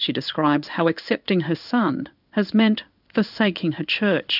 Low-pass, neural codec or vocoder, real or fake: 5.4 kHz; codec, 16 kHz in and 24 kHz out, 1 kbps, XY-Tokenizer; fake